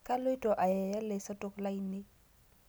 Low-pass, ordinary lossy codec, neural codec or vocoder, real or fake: none; none; none; real